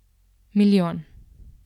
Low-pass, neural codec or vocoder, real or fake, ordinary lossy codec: 19.8 kHz; vocoder, 44.1 kHz, 128 mel bands every 256 samples, BigVGAN v2; fake; none